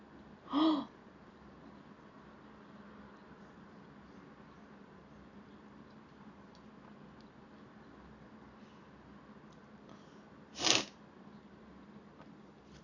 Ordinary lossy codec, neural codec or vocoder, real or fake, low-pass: AAC, 48 kbps; none; real; 7.2 kHz